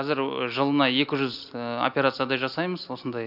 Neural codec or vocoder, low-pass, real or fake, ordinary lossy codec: none; 5.4 kHz; real; none